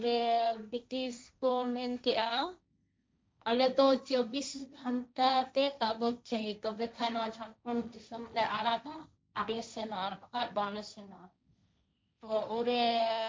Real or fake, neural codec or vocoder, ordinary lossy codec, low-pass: fake; codec, 16 kHz, 1.1 kbps, Voila-Tokenizer; none; none